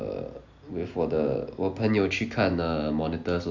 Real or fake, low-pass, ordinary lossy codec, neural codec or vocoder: real; 7.2 kHz; none; none